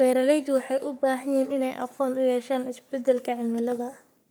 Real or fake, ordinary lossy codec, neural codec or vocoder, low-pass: fake; none; codec, 44.1 kHz, 3.4 kbps, Pupu-Codec; none